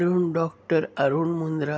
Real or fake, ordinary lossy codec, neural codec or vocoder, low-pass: real; none; none; none